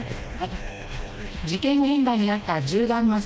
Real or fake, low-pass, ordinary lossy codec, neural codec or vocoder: fake; none; none; codec, 16 kHz, 1 kbps, FreqCodec, smaller model